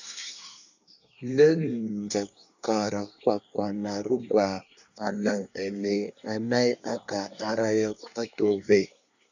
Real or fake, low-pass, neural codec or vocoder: fake; 7.2 kHz; codec, 24 kHz, 1 kbps, SNAC